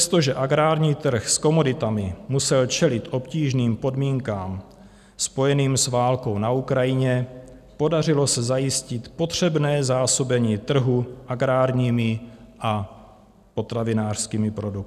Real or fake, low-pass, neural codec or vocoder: real; 14.4 kHz; none